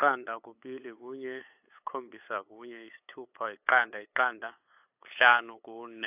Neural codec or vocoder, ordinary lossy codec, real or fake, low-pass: codec, 16 kHz, 8 kbps, FunCodec, trained on LibriTTS, 25 frames a second; AAC, 32 kbps; fake; 3.6 kHz